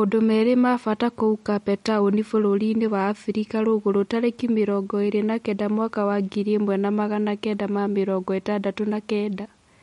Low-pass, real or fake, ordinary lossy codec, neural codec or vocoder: 19.8 kHz; real; MP3, 48 kbps; none